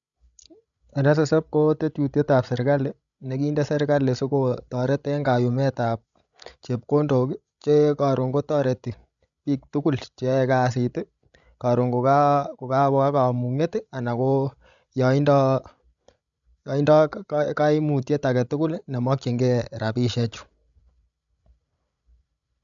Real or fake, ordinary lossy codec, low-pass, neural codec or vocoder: fake; none; 7.2 kHz; codec, 16 kHz, 16 kbps, FreqCodec, larger model